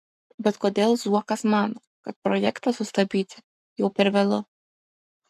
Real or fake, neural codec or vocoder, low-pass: fake; codec, 44.1 kHz, 7.8 kbps, Pupu-Codec; 14.4 kHz